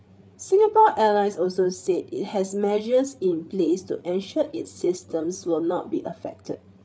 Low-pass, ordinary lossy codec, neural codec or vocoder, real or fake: none; none; codec, 16 kHz, 8 kbps, FreqCodec, larger model; fake